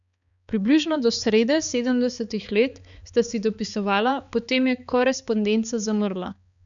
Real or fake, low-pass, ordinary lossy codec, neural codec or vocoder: fake; 7.2 kHz; none; codec, 16 kHz, 4 kbps, X-Codec, HuBERT features, trained on balanced general audio